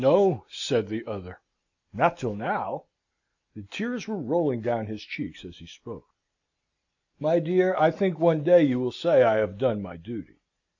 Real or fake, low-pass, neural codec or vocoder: real; 7.2 kHz; none